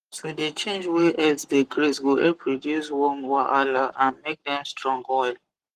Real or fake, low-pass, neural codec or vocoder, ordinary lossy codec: fake; 14.4 kHz; codec, 44.1 kHz, 7.8 kbps, Pupu-Codec; Opus, 24 kbps